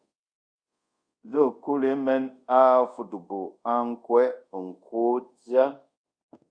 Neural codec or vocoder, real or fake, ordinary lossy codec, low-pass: codec, 24 kHz, 0.5 kbps, DualCodec; fake; Opus, 64 kbps; 9.9 kHz